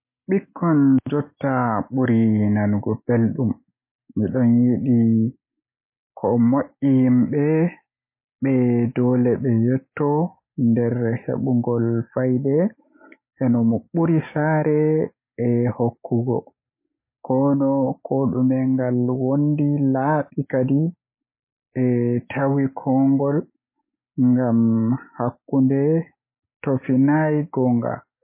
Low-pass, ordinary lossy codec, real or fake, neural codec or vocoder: 3.6 kHz; MP3, 24 kbps; real; none